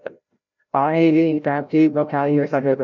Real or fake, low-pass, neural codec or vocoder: fake; 7.2 kHz; codec, 16 kHz, 0.5 kbps, FreqCodec, larger model